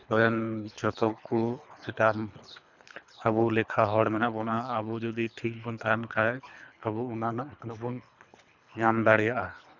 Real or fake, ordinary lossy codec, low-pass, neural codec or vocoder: fake; none; 7.2 kHz; codec, 24 kHz, 3 kbps, HILCodec